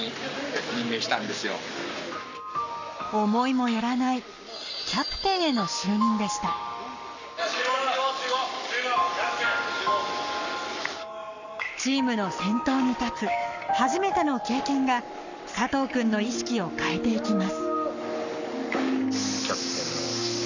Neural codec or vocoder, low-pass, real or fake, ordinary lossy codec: codec, 44.1 kHz, 7.8 kbps, Pupu-Codec; 7.2 kHz; fake; none